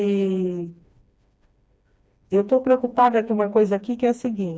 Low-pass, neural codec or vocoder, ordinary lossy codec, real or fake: none; codec, 16 kHz, 2 kbps, FreqCodec, smaller model; none; fake